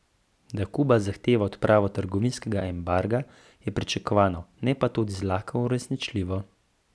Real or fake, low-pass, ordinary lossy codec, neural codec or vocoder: real; none; none; none